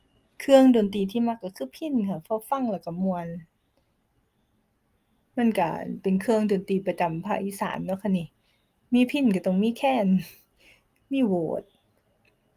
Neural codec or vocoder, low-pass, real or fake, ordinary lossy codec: none; 14.4 kHz; real; Opus, 32 kbps